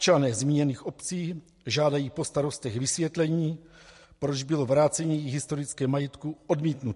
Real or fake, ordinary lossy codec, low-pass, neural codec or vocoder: real; MP3, 48 kbps; 14.4 kHz; none